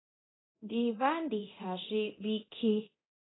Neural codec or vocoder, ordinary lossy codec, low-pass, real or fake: codec, 24 kHz, 0.9 kbps, DualCodec; AAC, 16 kbps; 7.2 kHz; fake